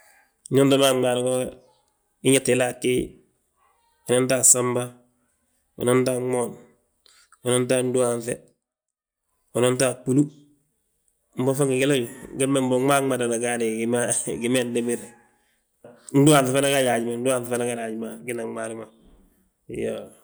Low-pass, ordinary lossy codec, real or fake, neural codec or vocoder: none; none; real; none